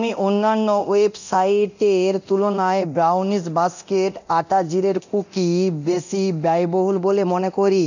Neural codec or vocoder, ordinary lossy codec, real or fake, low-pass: codec, 24 kHz, 0.9 kbps, DualCodec; none; fake; 7.2 kHz